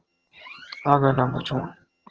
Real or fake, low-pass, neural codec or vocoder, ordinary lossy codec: fake; 7.2 kHz; vocoder, 22.05 kHz, 80 mel bands, HiFi-GAN; Opus, 16 kbps